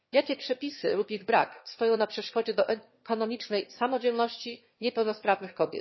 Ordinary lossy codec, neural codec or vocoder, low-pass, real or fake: MP3, 24 kbps; autoencoder, 22.05 kHz, a latent of 192 numbers a frame, VITS, trained on one speaker; 7.2 kHz; fake